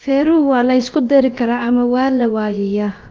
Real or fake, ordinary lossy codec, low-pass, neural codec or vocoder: fake; Opus, 24 kbps; 7.2 kHz; codec, 16 kHz, about 1 kbps, DyCAST, with the encoder's durations